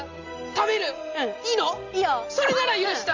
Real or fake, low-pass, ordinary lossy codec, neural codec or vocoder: real; 7.2 kHz; Opus, 32 kbps; none